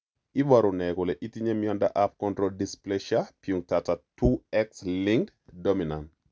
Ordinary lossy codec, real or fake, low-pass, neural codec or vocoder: none; real; none; none